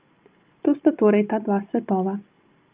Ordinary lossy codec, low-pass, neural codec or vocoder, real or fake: Opus, 32 kbps; 3.6 kHz; none; real